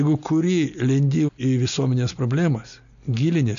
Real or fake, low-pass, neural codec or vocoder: real; 7.2 kHz; none